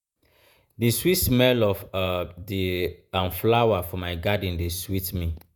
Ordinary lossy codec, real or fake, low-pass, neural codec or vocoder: none; fake; none; vocoder, 48 kHz, 128 mel bands, Vocos